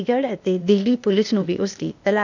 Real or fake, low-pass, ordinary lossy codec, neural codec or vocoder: fake; 7.2 kHz; none; codec, 16 kHz, 0.8 kbps, ZipCodec